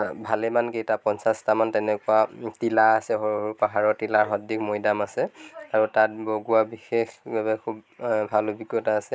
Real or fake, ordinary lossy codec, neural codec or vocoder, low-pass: real; none; none; none